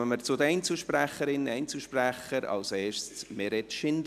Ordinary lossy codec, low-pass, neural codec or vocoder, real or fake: none; 14.4 kHz; none; real